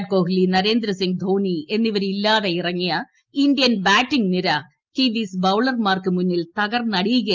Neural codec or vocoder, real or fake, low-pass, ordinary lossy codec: none; real; 7.2 kHz; Opus, 32 kbps